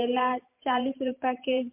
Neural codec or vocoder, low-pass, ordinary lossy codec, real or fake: vocoder, 44.1 kHz, 128 mel bands every 512 samples, BigVGAN v2; 3.6 kHz; MP3, 32 kbps; fake